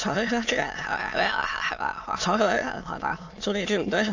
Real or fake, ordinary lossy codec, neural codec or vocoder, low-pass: fake; AAC, 48 kbps; autoencoder, 22.05 kHz, a latent of 192 numbers a frame, VITS, trained on many speakers; 7.2 kHz